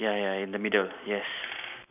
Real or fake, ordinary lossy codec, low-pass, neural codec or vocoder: real; none; 3.6 kHz; none